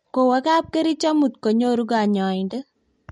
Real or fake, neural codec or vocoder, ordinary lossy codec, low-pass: real; none; MP3, 48 kbps; 19.8 kHz